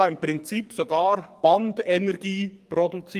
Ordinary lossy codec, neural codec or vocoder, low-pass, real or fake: Opus, 32 kbps; codec, 44.1 kHz, 2.6 kbps, SNAC; 14.4 kHz; fake